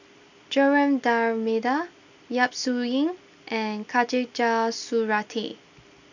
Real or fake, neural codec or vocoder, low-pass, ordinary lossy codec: real; none; 7.2 kHz; none